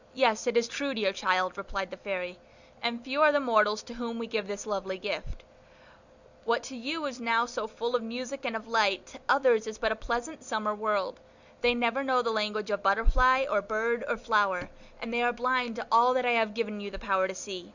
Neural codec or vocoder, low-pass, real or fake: none; 7.2 kHz; real